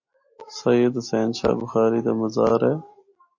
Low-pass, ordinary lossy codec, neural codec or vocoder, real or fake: 7.2 kHz; MP3, 32 kbps; none; real